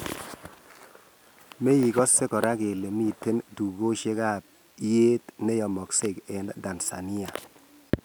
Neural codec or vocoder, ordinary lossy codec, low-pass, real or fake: none; none; none; real